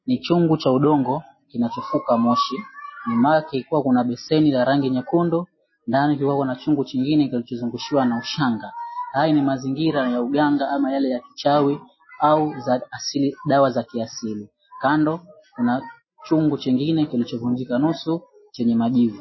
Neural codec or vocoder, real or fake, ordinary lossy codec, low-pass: none; real; MP3, 24 kbps; 7.2 kHz